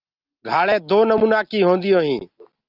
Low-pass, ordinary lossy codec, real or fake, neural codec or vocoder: 5.4 kHz; Opus, 32 kbps; real; none